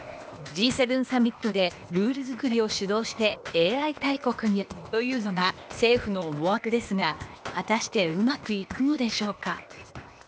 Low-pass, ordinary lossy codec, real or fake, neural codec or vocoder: none; none; fake; codec, 16 kHz, 0.8 kbps, ZipCodec